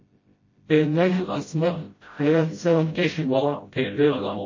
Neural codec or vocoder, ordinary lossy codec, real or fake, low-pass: codec, 16 kHz, 0.5 kbps, FreqCodec, smaller model; MP3, 32 kbps; fake; 7.2 kHz